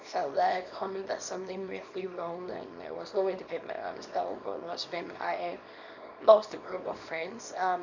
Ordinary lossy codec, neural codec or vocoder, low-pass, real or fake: none; codec, 24 kHz, 0.9 kbps, WavTokenizer, small release; 7.2 kHz; fake